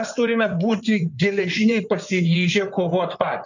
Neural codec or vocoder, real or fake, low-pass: codec, 16 kHz in and 24 kHz out, 2.2 kbps, FireRedTTS-2 codec; fake; 7.2 kHz